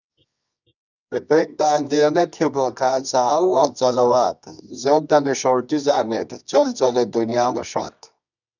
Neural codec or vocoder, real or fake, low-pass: codec, 24 kHz, 0.9 kbps, WavTokenizer, medium music audio release; fake; 7.2 kHz